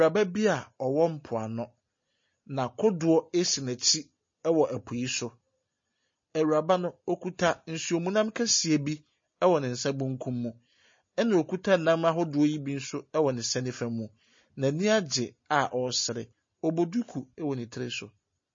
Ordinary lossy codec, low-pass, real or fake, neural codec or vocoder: MP3, 32 kbps; 7.2 kHz; real; none